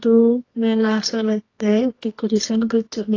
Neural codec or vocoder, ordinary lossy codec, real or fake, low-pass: codec, 24 kHz, 0.9 kbps, WavTokenizer, medium music audio release; AAC, 32 kbps; fake; 7.2 kHz